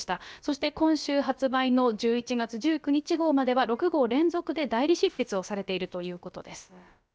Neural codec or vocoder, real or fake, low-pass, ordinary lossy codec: codec, 16 kHz, about 1 kbps, DyCAST, with the encoder's durations; fake; none; none